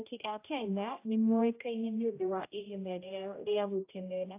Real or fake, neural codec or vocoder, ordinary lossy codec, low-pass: fake; codec, 16 kHz, 0.5 kbps, X-Codec, HuBERT features, trained on general audio; AAC, 24 kbps; 3.6 kHz